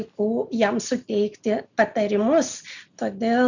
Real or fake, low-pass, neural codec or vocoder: real; 7.2 kHz; none